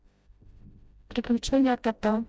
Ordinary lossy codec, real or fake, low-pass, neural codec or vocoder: none; fake; none; codec, 16 kHz, 0.5 kbps, FreqCodec, smaller model